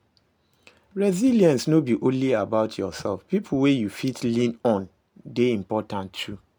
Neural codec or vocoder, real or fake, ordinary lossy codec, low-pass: none; real; none; none